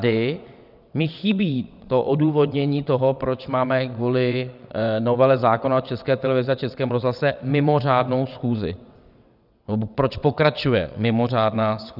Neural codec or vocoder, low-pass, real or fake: vocoder, 22.05 kHz, 80 mel bands, WaveNeXt; 5.4 kHz; fake